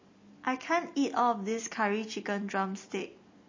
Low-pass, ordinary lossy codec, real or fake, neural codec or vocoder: 7.2 kHz; MP3, 32 kbps; real; none